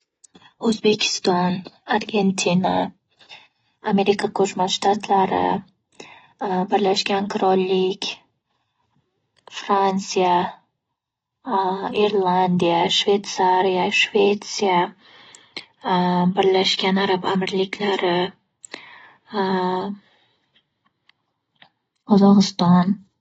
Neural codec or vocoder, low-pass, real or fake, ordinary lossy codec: none; 10.8 kHz; real; AAC, 24 kbps